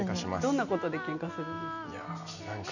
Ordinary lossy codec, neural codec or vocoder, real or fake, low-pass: none; none; real; 7.2 kHz